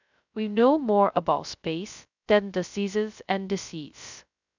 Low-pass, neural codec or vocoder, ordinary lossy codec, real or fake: 7.2 kHz; codec, 16 kHz, 0.2 kbps, FocalCodec; none; fake